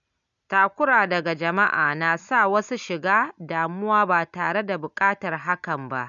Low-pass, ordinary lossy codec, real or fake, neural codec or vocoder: 7.2 kHz; none; real; none